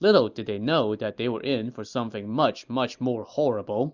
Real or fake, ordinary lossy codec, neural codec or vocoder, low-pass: real; Opus, 64 kbps; none; 7.2 kHz